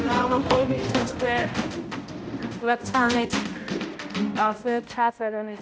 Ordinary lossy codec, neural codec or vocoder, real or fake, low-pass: none; codec, 16 kHz, 0.5 kbps, X-Codec, HuBERT features, trained on balanced general audio; fake; none